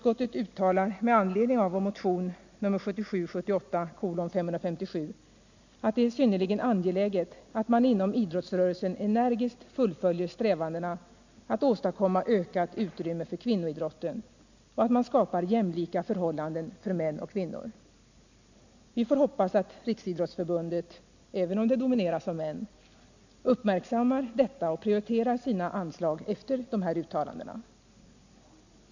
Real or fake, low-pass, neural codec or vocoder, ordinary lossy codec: real; 7.2 kHz; none; none